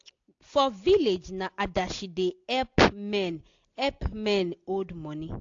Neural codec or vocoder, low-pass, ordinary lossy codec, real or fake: none; 7.2 kHz; AAC, 48 kbps; real